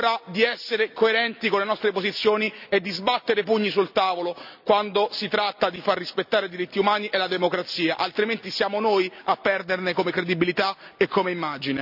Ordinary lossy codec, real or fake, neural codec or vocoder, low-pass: none; real; none; 5.4 kHz